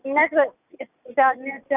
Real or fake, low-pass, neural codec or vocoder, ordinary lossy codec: real; 3.6 kHz; none; none